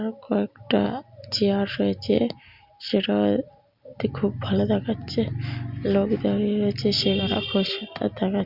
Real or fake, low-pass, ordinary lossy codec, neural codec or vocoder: real; 5.4 kHz; Opus, 64 kbps; none